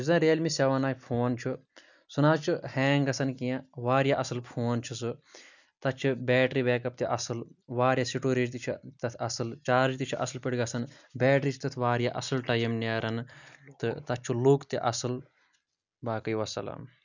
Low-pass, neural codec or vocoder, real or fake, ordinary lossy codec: 7.2 kHz; none; real; none